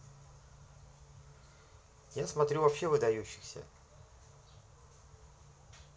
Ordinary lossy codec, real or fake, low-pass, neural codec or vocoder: none; real; none; none